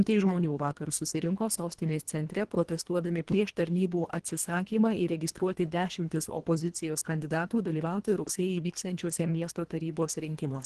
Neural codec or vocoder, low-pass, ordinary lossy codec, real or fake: codec, 24 kHz, 1.5 kbps, HILCodec; 10.8 kHz; Opus, 16 kbps; fake